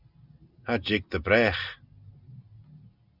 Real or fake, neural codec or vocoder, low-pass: real; none; 5.4 kHz